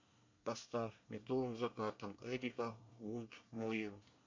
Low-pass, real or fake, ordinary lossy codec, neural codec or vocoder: 7.2 kHz; fake; MP3, 48 kbps; codec, 24 kHz, 1 kbps, SNAC